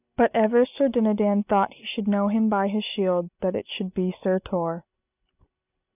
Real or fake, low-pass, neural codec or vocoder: real; 3.6 kHz; none